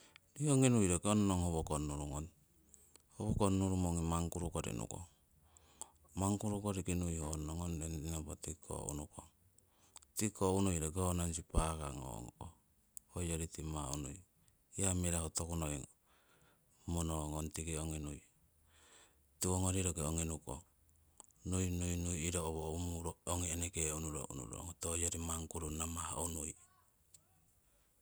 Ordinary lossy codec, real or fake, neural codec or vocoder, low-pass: none; real; none; none